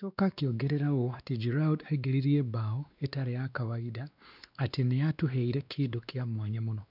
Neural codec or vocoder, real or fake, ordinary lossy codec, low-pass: codec, 16 kHz, 2 kbps, X-Codec, WavLM features, trained on Multilingual LibriSpeech; fake; none; 5.4 kHz